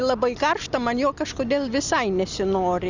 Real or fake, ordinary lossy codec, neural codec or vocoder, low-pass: real; Opus, 64 kbps; none; 7.2 kHz